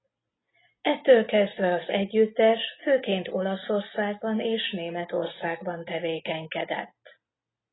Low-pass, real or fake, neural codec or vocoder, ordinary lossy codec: 7.2 kHz; real; none; AAC, 16 kbps